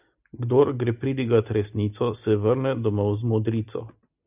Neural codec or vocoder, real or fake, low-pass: none; real; 3.6 kHz